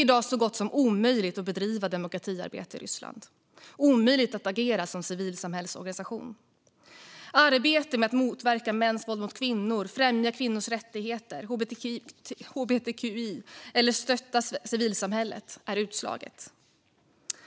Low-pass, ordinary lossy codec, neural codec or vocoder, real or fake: none; none; none; real